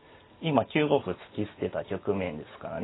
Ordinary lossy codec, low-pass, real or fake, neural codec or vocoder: AAC, 16 kbps; 7.2 kHz; real; none